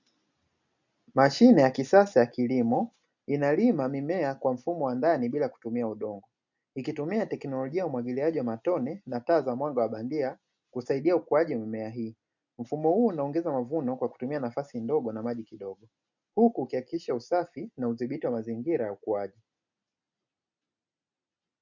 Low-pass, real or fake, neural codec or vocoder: 7.2 kHz; real; none